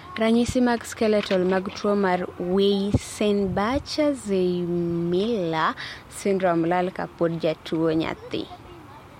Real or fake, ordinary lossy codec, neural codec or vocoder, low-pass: real; MP3, 64 kbps; none; 19.8 kHz